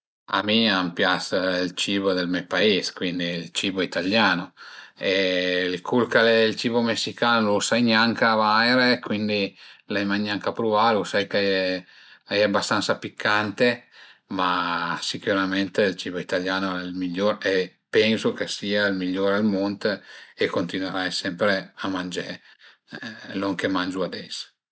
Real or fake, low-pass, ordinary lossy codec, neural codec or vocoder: real; none; none; none